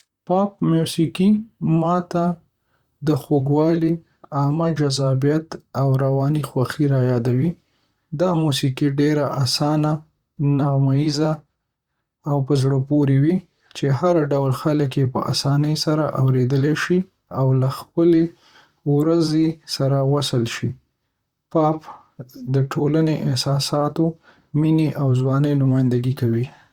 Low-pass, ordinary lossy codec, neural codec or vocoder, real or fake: 19.8 kHz; Opus, 64 kbps; vocoder, 44.1 kHz, 128 mel bands, Pupu-Vocoder; fake